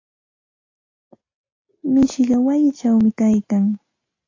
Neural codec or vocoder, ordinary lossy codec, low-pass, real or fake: none; AAC, 32 kbps; 7.2 kHz; real